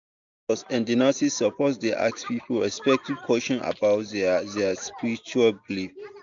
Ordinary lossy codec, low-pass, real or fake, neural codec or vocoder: MP3, 64 kbps; 7.2 kHz; real; none